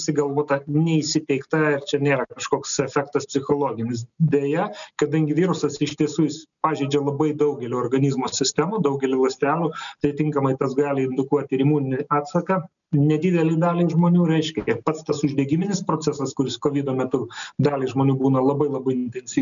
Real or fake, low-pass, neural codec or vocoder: real; 7.2 kHz; none